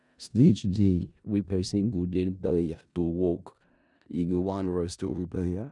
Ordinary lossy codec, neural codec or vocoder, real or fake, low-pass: none; codec, 16 kHz in and 24 kHz out, 0.4 kbps, LongCat-Audio-Codec, four codebook decoder; fake; 10.8 kHz